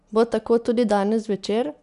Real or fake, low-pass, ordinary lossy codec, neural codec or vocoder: real; 10.8 kHz; none; none